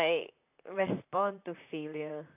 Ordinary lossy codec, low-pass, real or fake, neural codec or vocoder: AAC, 24 kbps; 3.6 kHz; fake; vocoder, 44.1 kHz, 128 mel bands, Pupu-Vocoder